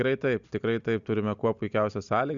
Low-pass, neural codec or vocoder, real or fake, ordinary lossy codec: 7.2 kHz; none; real; Opus, 64 kbps